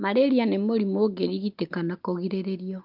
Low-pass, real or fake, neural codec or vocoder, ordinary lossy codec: 5.4 kHz; real; none; Opus, 32 kbps